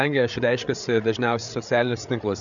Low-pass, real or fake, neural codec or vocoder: 7.2 kHz; fake; codec, 16 kHz, 8 kbps, FreqCodec, larger model